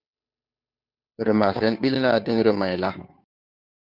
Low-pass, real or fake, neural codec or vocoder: 5.4 kHz; fake; codec, 16 kHz, 2 kbps, FunCodec, trained on Chinese and English, 25 frames a second